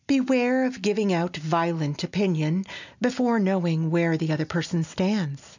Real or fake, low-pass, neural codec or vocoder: real; 7.2 kHz; none